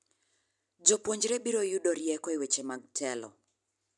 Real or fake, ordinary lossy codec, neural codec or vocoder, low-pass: real; none; none; 10.8 kHz